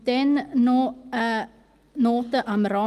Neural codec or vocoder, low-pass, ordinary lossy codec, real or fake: none; 14.4 kHz; Opus, 24 kbps; real